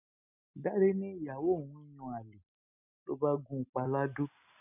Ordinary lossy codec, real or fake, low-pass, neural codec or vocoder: none; real; 3.6 kHz; none